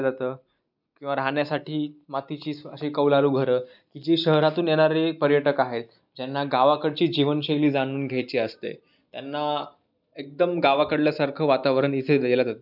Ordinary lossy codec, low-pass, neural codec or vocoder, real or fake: none; 5.4 kHz; none; real